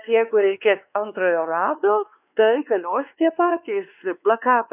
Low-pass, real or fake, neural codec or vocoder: 3.6 kHz; fake; codec, 16 kHz, 2 kbps, X-Codec, WavLM features, trained on Multilingual LibriSpeech